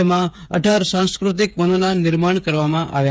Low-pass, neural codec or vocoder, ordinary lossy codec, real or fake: none; codec, 16 kHz, 8 kbps, FreqCodec, smaller model; none; fake